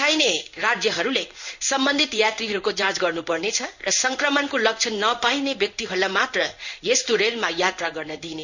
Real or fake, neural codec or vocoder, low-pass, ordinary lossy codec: fake; codec, 16 kHz in and 24 kHz out, 1 kbps, XY-Tokenizer; 7.2 kHz; none